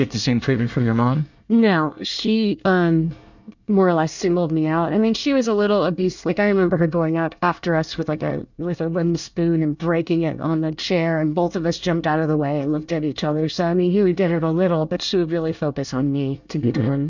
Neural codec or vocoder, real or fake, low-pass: codec, 24 kHz, 1 kbps, SNAC; fake; 7.2 kHz